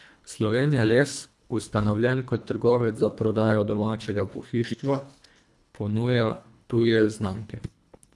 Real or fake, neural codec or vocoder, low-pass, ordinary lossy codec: fake; codec, 24 kHz, 1.5 kbps, HILCodec; none; none